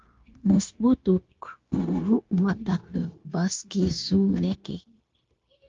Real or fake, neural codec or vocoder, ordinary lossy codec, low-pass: fake; codec, 16 kHz, 0.9 kbps, LongCat-Audio-Codec; Opus, 32 kbps; 7.2 kHz